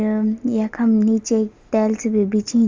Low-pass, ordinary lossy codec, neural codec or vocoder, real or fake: 7.2 kHz; Opus, 32 kbps; none; real